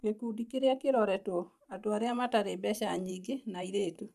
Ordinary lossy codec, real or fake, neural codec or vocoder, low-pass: none; fake; vocoder, 44.1 kHz, 128 mel bands every 512 samples, BigVGAN v2; 14.4 kHz